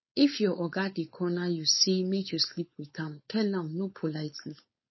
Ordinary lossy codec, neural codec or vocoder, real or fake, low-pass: MP3, 24 kbps; codec, 16 kHz, 4.8 kbps, FACodec; fake; 7.2 kHz